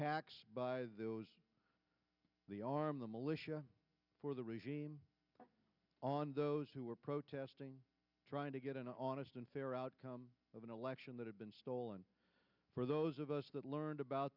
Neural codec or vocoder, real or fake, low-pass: none; real; 5.4 kHz